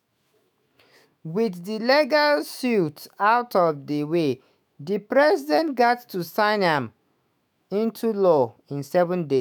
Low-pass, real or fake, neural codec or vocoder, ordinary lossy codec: none; fake; autoencoder, 48 kHz, 128 numbers a frame, DAC-VAE, trained on Japanese speech; none